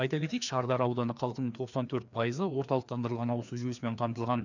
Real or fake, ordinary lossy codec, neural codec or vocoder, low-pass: fake; none; codec, 16 kHz, 2 kbps, FreqCodec, larger model; 7.2 kHz